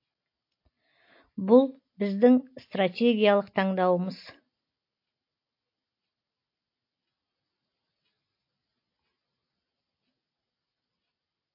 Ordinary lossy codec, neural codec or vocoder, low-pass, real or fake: MP3, 32 kbps; none; 5.4 kHz; real